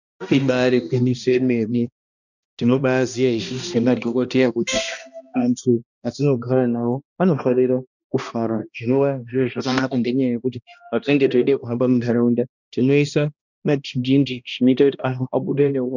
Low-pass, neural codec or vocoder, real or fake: 7.2 kHz; codec, 16 kHz, 1 kbps, X-Codec, HuBERT features, trained on balanced general audio; fake